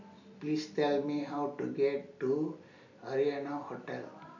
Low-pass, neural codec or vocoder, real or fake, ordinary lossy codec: 7.2 kHz; none; real; none